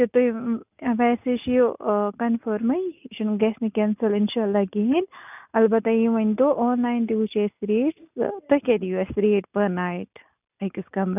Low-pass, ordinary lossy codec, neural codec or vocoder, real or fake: 3.6 kHz; none; none; real